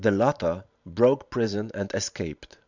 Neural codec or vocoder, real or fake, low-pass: vocoder, 44.1 kHz, 128 mel bands every 512 samples, BigVGAN v2; fake; 7.2 kHz